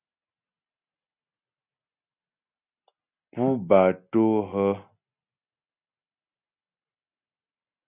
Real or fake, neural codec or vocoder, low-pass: real; none; 3.6 kHz